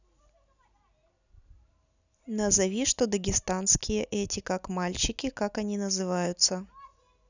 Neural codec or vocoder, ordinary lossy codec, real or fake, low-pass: none; none; real; 7.2 kHz